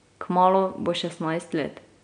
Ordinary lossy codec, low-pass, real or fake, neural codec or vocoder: none; 9.9 kHz; real; none